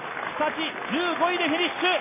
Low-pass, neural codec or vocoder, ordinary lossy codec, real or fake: 3.6 kHz; none; MP3, 16 kbps; real